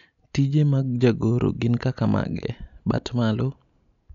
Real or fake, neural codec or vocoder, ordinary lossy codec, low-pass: real; none; none; 7.2 kHz